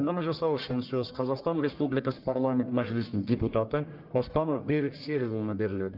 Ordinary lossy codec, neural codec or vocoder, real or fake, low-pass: Opus, 24 kbps; codec, 44.1 kHz, 1.7 kbps, Pupu-Codec; fake; 5.4 kHz